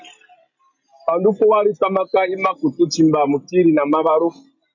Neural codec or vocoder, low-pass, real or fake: none; 7.2 kHz; real